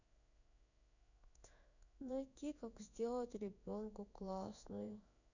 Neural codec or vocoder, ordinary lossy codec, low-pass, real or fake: codec, 16 kHz in and 24 kHz out, 1 kbps, XY-Tokenizer; none; 7.2 kHz; fake